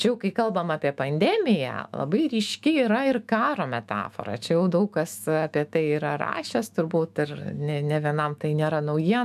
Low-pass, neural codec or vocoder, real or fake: 14.4 kHz; autoencoder, 48 kHz, 128 numbers a frame, DAC-VAE, trained on Japanese speech; fake